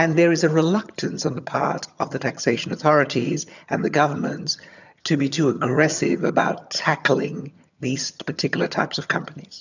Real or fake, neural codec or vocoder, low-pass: fake; vocoder, 22.05 kHz, 80 mel bands, HiFi-GAN; 7.2 kHz